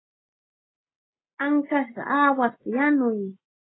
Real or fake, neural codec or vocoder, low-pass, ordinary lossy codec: real; none; 7.2 kHz; AAC, 16 kbps